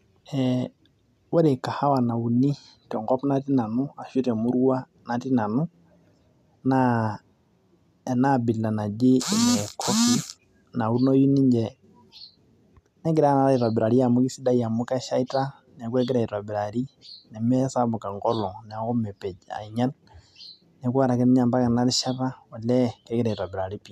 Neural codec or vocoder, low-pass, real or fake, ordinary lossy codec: none; 14.4 kHz; real; none